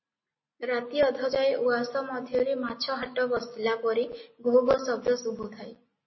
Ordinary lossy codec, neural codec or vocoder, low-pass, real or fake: MP3, 24 kbps; none; 7.2 kHz; real